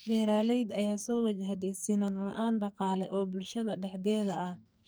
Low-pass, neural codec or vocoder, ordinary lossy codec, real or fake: none; codec, 44.1 kHz, 2.6 kbps, SNAC; none; fake